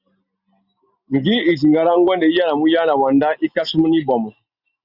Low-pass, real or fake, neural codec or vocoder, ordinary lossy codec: 5.4 kHz; real; none; Opus, 64 kbps